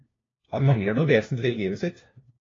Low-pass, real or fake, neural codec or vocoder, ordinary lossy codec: 7.2 kHz; fake; codec, 16 kHz, 1 kbps, FunCodec, trained on LibriTTS, 50 frames a second; AAC, 32 kbps